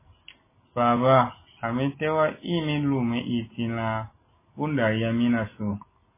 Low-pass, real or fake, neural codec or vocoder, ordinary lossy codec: 3.6 kHz; real; none; MP3, 16 kbps